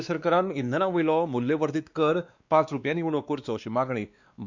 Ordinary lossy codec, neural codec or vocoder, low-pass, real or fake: Opus, 64 kbps; codec, 16 kHz, 2 kbps, X-Codec, WavLM features, trained on Multilingual LibriSpeech; 7.2 kHz; fake